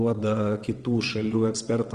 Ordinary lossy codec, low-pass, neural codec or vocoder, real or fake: Opus, 24 kbps; 9.9 kHz; vocoder, 22.05 kHz, 80 mel bands, WaveNeXt; fake